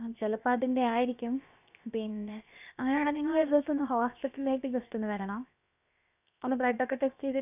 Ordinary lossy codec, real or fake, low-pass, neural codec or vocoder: none; fake; 3.6 kHz; codec, 16 kHz, 0.7 kbps, FocalCodec